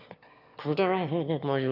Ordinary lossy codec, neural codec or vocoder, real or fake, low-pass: none; autoencoder, 22.05 kHz, a latent of 192 numbers a frame, VITS, trained on one speaker; fake; 5.4 kHz